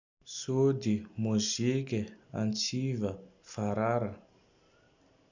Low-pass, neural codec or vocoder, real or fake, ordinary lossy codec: 7.2 kHz; none; real; none